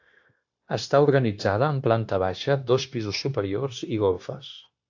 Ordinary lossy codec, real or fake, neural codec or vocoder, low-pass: AAC, 48 kbps; fake; codec, 16 kHz, 0.9 kbps, LongCat-Audio-Codec; 7.2 kHz